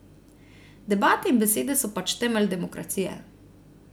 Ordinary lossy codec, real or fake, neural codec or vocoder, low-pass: none; real; none; none